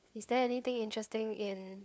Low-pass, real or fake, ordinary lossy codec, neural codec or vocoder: none; fake; none; codec, 16 kHz, 4 kbps, FunCodec, trained on LibriTTS, 50 frames a second